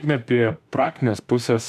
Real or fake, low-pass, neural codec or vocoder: fake; 14.4 kHz; autoencoder, 48 kHz, 32 numbers a frame, DAC-VAE, trained on Japanese speech